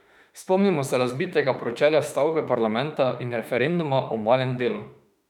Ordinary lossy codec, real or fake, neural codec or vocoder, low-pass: none; fake; autoencoder, 48 kHz, 32 numbers a frame, DAC-VAE, trained on Japanese speech; 19.8 kHz